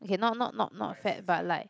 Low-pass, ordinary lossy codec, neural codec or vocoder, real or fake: none; none; none; real